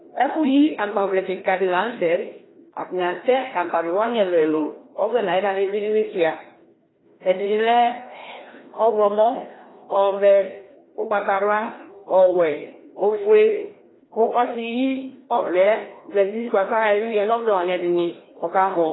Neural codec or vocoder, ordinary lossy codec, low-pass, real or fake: codec, 16 kHz, 1 kbps, FreqCodec, larger model; AAC, 16 kbps; 7.2 kHz; fake